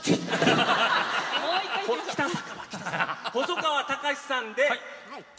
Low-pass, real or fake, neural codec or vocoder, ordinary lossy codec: none; real; none; none